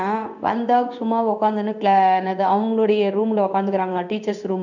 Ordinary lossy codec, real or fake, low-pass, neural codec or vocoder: none; fake; 7.2 kHz; codec, 16 kHz in and 24 kHz out, 1 kbps, XY-Tokenizer